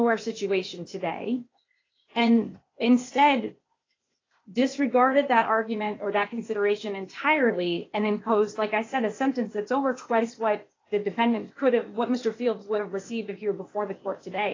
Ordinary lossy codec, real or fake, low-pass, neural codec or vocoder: AAC, 32 kbps; fake; 7.2 kHz; codec, 16 kHz, 0.8 kbps, ZipCodec